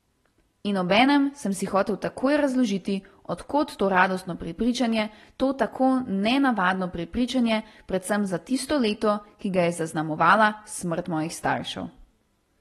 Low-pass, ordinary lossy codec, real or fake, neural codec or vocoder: 19.8 kHz; AAC, 32 kbps; real; none